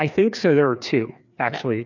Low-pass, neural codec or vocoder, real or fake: 7.2 kHz; codec, 16 kHz, 2 kbps, FreqCodec, larger model; fake